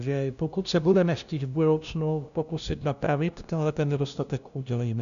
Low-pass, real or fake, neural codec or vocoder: 7.2 kHz; fake; codec, 16 kHz, 0.5 kbps, FunCodec, trained on LibriTTS, 25 frames a second